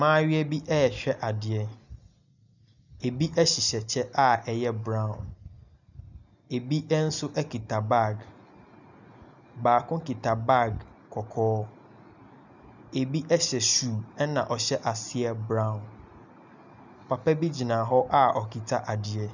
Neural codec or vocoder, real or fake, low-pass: none; real; 7.2 kHz